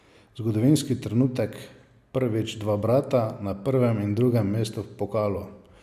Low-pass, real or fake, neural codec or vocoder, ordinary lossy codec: 14.4 kHz; real; none; none